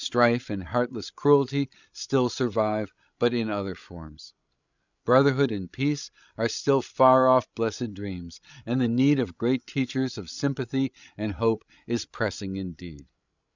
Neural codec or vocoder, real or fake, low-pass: codec, 16 kHz, 16 kbps, FreqCodec, larger model; fake; 7.2 kHz